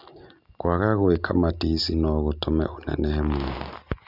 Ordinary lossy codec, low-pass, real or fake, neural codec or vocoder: none; 5.4 kHz; real; none